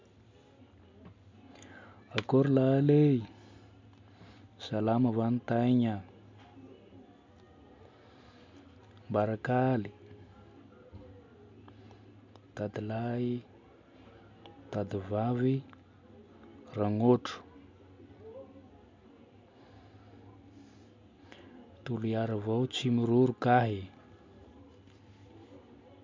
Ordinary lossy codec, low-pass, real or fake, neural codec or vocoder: MP3, 64 kbps; 7.2 kHz; real; none